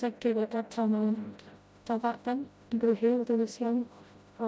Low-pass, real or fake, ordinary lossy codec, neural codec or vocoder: none; fake; none; codec, 16 kHz, 0.5 kbps, FreqCodec, smaller model